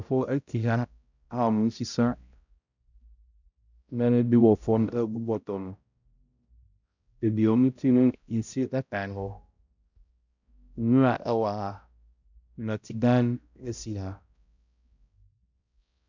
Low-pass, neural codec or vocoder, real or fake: 7.2 kHz; codec, 16 kHz, 0.5 kbps, X-Codec, HuBERT features, trained on balanced general audio; fake